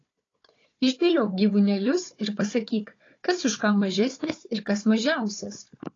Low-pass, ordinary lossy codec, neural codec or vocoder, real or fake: 7.2 kHz; AAC, 32 kbps; codec, 16 kHz, 4 kbps, FunCodec, trained on Chinese and English, 50 frames a second; fake